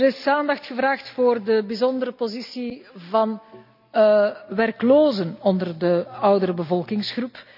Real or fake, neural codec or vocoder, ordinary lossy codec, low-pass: real; none; none; 5.4 kHz